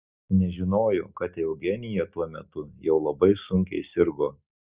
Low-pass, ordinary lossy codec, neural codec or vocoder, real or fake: 3.6 kHz; Opus, 64 kbps; none; real